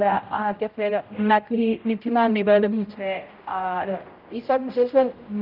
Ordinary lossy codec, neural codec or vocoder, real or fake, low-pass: Opus, 24 kbps; codec, 16 kHz, 0.5 kbps, X-Codec, HuBERT features, trained on general audio; fake; 5.4 kHz